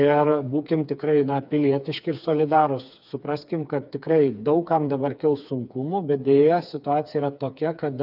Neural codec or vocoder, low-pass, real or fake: codec, 16 kHz, 4 kbps, FreqCodec, smaller model; 5.4 kHz; fake